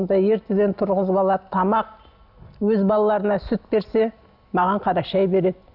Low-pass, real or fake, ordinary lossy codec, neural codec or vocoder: 5.4 kHz; real; none; none